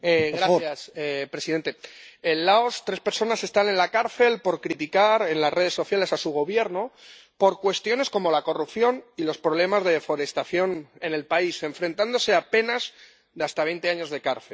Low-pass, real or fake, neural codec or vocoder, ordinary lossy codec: none; real; none; none